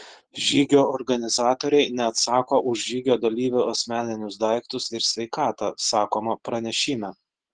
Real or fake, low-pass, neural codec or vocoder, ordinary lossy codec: real; 9.9 kHz; none; Opus, 16 kbps